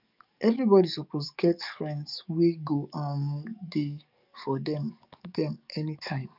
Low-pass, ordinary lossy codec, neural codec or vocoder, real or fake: 5.4 kHz; none; codec, 44.1 kHz, 7.8 kbps, DAC; fake